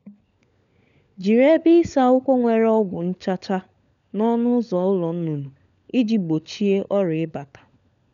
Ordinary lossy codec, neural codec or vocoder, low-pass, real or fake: none; codec, 16 kHz, 16 kbps, FunCodec, trained on LibriTTS, 50 frames a second; 7.2 kHz; fake